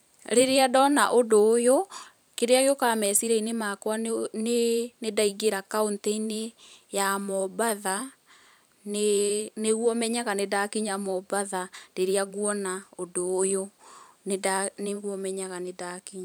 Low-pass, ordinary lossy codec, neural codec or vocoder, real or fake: none; none; vocoder, 44.1 kHz, 128 mel bands every 512 samples, BigVGAN v2; fake